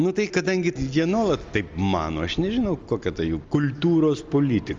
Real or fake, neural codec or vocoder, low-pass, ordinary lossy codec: real; none; 7.2 kHz; Opus, 32 kbps